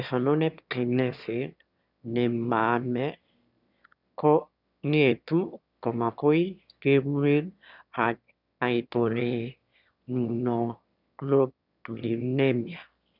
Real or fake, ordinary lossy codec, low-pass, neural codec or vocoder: fake; none; 5.4 kHz; autoencoder, 22.05 kHz, a latent of 192 numbers a frame, VITS, trained on one speaker